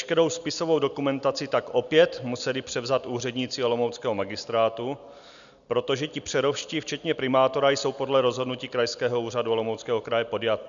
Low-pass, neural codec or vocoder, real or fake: 7.2 kHz; none; real